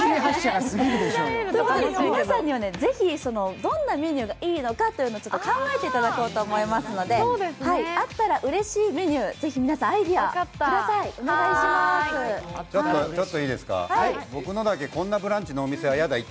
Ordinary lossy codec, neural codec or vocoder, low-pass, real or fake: none; none; none; real